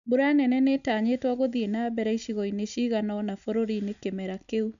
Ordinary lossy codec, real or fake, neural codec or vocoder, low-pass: none; real; none; 7.2 kHz